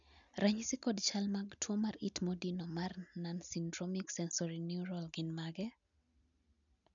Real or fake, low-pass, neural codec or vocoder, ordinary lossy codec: real; 7.2 kHz; none; none